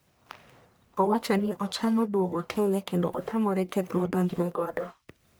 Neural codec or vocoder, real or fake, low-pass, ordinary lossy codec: codec, 44.1 kHz, 1.7 kbps, Pupu-Codec; fake; none; none